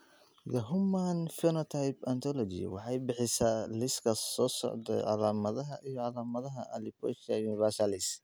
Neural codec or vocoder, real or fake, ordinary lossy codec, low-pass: none; real; none; none